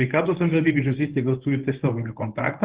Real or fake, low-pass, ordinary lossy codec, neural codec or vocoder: fake; 3.6 kHz; Opus, 16 kbps; codec, 24 kHz, 0.9 kbps, WavTokenizer, medium speech release version 1